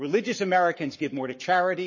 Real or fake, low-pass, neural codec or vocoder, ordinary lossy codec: fake; 7.2 kHz; codec, 44.1 kHz, 7.8 kbps, Pupu-Codec; MP3, 32 kbps